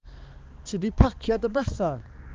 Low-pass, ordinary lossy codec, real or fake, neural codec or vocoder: 7.2 kHz; Opus, 24 kbps; fake; codec, 16 kHz, 2 kbps, X-Codec, HuBERT features, trained on balanced general audio